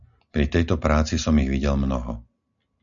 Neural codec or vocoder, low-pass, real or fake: none; 7.2 kHz; real